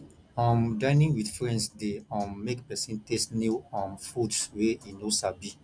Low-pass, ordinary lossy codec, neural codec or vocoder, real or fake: 9.9 kHz; none; none; real